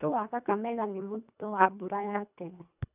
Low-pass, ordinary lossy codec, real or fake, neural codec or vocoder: 3.6 kHz; none; fake; codec, 24 kHz, 1.5 kbps, HILCodec